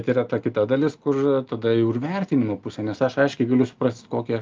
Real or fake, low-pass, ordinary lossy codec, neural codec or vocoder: real; 7.2 kHz; Opus, 24 kbps; none